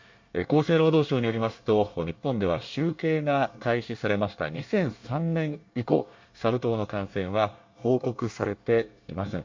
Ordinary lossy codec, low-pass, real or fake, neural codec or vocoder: MP3, 48 kbps; 7.2 kHz; fake; codec, 24 kHz, 1 kbps, SNAC